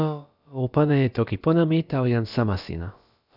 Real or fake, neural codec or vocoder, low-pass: fake; codec, 16 kHz, about 1 kbps, DyCAST, with the encoder's durations; 5.4 kHz